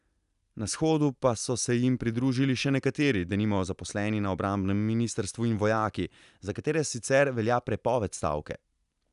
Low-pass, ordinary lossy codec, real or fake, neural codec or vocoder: 10.8 kHz; none; real; none